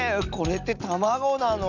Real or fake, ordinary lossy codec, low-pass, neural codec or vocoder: real; none; 7.2 kHz; none